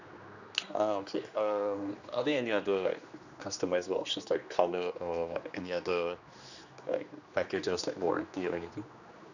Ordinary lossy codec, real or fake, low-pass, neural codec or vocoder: none; fake; 7.2 kHz; codec, 16 kHz, 2 kbps, X-Codec, HuBERT features, trained on general audio